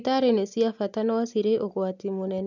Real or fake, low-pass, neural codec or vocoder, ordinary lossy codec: real; 7.2 kHz; none; none